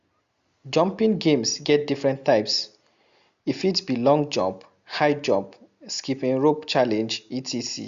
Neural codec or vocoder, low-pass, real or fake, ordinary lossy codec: none; 7.2 kHz; real; Opus, 64 kbps